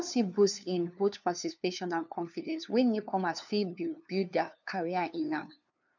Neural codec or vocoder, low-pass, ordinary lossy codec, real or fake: codec, 16 kHz, 2 kbps, FunCodec, trained on LibriTTS, 25 frames a second; 7.2 kHz; none; fake